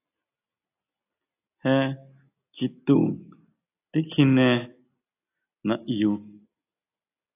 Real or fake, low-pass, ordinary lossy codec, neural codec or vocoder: real; 3.6 kHz; AAC, 24 kbps; none